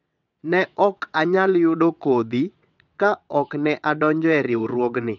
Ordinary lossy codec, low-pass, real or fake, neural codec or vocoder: none; 7.2 kHz; fake; vocoder, 22.05 kHz, 80 mel bands, WaveNeXt